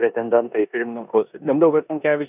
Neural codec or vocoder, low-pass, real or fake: codec, 16 kHz in and 24 kHz out, 0.9 kbps, LongCat-Audio-Codec, four codebook decoder; 3.6 kHz; fake